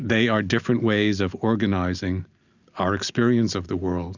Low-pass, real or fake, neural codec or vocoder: 7.2 kHz; real; none